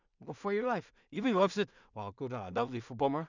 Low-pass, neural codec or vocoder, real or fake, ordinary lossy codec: 7.2 kHz; codec, 16 kHz in and 24 kHz out, 0.4 kbps, LongCat-Audio-Codec, two codebook decoder; fake; none